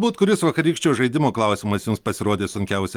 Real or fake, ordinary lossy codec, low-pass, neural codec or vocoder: real; Opus, 32 kbps; 19.8 kHz; none